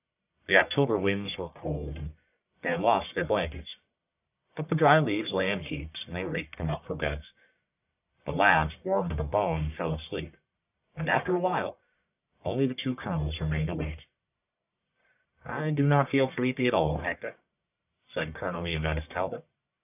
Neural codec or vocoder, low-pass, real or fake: codec, 44.1 kHz, 1.7 kbps, Pupu-Codec; 3.6 kHz; fake